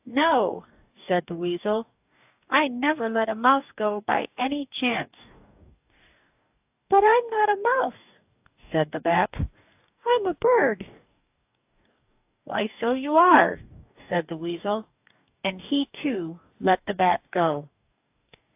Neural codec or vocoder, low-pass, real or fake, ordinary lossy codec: codec, 44.1 kHz, 2.6 kbps, DAC; 3.6 kHz; fake; AAC, 32 kbps